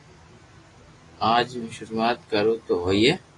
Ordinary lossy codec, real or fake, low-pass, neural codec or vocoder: AAC, 32 kbps; real; 10.8 kHz; none